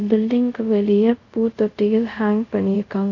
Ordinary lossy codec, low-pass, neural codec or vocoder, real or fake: none; 7.2 kHz; codec, 24 kHz, 0.5 kbps, DualCodec; fake